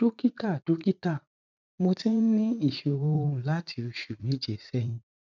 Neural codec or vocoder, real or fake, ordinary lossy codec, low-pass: vocoder, 44.1 kHz, 80 mel bands, Vocos; fake; none; 7.2 kHz